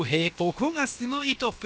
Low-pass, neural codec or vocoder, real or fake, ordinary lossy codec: none; codec, 16 kHz, about 1 kbps, DyCAST, with the encoder's durations; fake; none